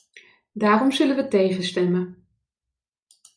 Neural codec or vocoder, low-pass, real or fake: none; 9.9 kHz; real